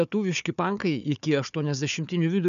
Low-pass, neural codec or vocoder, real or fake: 7.2 kHz; codec, 16 kHz, 4 kbps, FunCodec, trained on Chinese and English, 50 frames a second; fake